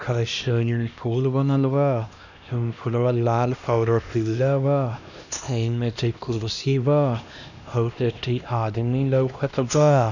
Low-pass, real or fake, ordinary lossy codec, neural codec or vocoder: 7.2 kHz; fake; none; codec, 16 kHz, 1 kbps, X-Codec, HuBERT features, trained on LibriSpeech